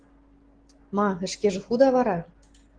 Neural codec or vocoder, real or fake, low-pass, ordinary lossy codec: none; real; 9.9 kHz; Opus, 16 kbps